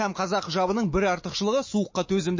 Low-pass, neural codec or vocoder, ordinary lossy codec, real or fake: 7.2 kHz; autoencoder, 48 kHz, 128 numbers a frame, DAC-VAE, trained on Japanese speech; MP3, 32 kbps; fake